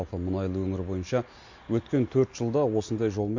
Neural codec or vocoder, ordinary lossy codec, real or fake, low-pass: none; MP3, 48 kbps; real; 7.2 kHz